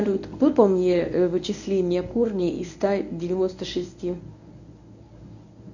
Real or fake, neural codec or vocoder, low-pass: fake; codec, 24 kHz, 0.9 kbps, WavTokenizer, medium speech release version 1; 7.2 kHz